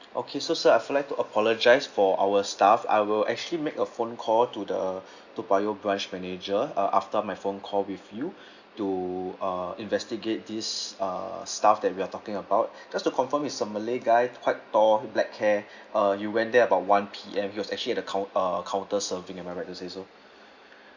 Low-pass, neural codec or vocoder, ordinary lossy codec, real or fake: 7.2 kHz; none; Opus, 64 kbps; real